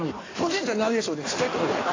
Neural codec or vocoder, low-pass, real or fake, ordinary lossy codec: codec, 16 kHz in and 24 kHz out, 1.1 kbps, FireRedTTS-2 codec; 7.2 kHz; fake; AAC, 32 kbps